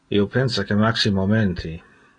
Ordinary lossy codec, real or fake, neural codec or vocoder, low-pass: AAC, 32 kbps; real; none; 9.9 kHz